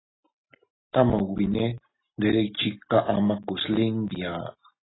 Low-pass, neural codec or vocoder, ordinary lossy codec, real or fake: 7.2 kHz; none; AAC, 16 kbps; real